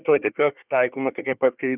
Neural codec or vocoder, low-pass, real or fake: codec, 24 kHz, 1 kbps, SNAC; 3.6 kHz; fake